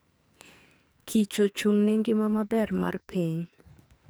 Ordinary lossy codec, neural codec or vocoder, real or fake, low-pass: none; codec, 44.1 kHz, 2.6 kbps, SNAC; fake; none